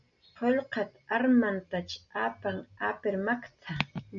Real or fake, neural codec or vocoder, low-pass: real; none; 7.2 kHz